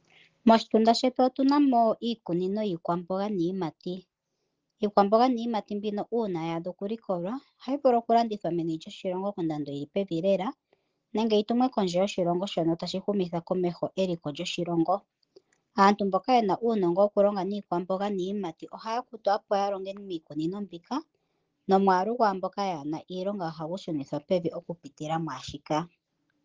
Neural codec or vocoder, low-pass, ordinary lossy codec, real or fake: none; 7.2 kHz; Opus, 16 kbps; real